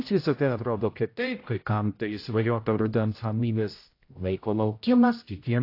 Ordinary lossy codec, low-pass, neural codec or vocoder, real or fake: AAC, 32 kbps; 5.4 kHz; codec, 16 kHz, 0.5 kbps, X-Codec, HuBERT features, trained on general audio; fake